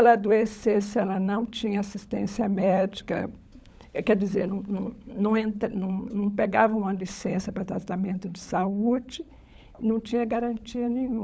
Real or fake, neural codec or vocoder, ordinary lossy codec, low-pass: fake; codec, 16 kHz, 16 kbps, FunCodec, trained on LibriTTS, 50 frames a second; none; none